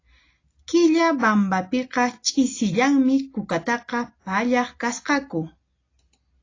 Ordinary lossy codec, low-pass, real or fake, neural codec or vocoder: AAC, 32 kbps; 7.2 kHz; real; none